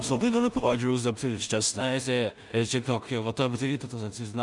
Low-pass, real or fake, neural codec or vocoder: 10.8 kHz; fake; codec, 16 kHz in and 24 kHz out, 0.4 kbps, LongCat-Audio-Codec, two codebook decoder